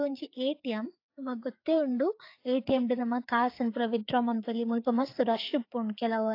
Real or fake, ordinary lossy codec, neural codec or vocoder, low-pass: fake; AAC, 32 kbps; codec, 16 kHz, 4 kbps, FreqCodec, larger model; 5.4 kHz